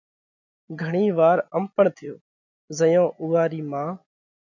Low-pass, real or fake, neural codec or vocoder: 7.2 kHz; real; none